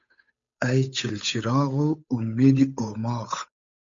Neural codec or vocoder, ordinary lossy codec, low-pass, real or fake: codec, 16 kHz, 8 kbps, FunCodec, trained on Chinese and English, 25 frames a second; MP3, 64 kbps; 7.2 kHz; fake